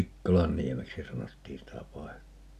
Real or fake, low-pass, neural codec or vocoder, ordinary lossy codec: real; 14.4 kHz; none; none